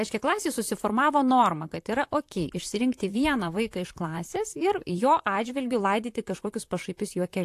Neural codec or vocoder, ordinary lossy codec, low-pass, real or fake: vocoder, 44.1 kHz, 128 mel bands, Pupu-Vocoder; AAC, 64 kbps; 14.4 kHz; fake